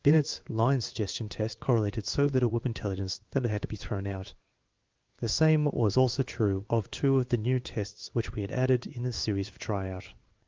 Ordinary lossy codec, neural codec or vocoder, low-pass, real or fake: Opus, 32 kbps; codec, 16 kHz in and 24 kHz out, 1 kbps, XY-Tokenizer; 7.2 kHz; fake